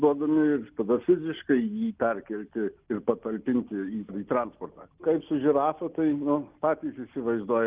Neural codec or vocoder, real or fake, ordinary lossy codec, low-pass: none; real; Opus, 32 kbps; 3.6 kHz